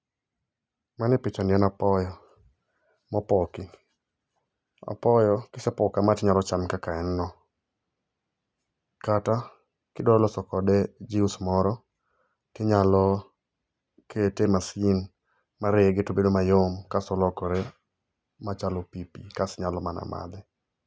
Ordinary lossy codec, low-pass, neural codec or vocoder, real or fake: none; none; none; real